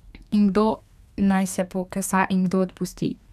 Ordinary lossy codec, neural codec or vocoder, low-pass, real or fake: none; codec, 32 kHz, 1.9 kbps, SNAC; 14.4 kHz; fake